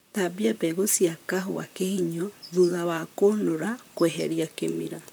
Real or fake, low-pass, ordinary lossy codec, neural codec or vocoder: fake; none; none; vocoder, 44.1 kHz, 128 mel bands, Pupu-Vocoder